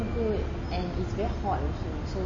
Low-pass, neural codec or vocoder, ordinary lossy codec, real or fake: 7.2 kHz; none; MP3, 32 kbps; real